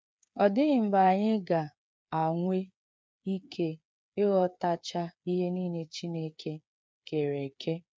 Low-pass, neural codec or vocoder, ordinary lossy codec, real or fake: none; codec, 16 kHz, 8 kbps, FreqCodec, smaller model; none; fake